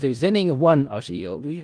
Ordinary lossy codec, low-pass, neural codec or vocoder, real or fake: Opus, 24 kbps; 9.9 kHz; codec, 16 kHz in and 24 kHz out, 0.4 kbps, LongCat-Audio-Codec, four codebook decoder; fake